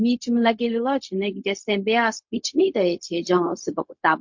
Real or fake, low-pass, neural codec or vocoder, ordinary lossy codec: fake; 7.2 kHz; codec, 16 kHz, 0.4 kbps, LongCat-Audio-Codec; MP3, 48 kbps